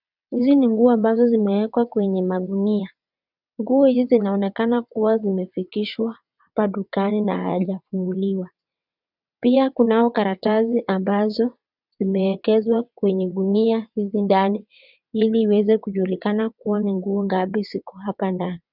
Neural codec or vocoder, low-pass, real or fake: vocoder, 22.05 kHz, 80 mel bands, WaveNeXt; 5.4 kHz; fake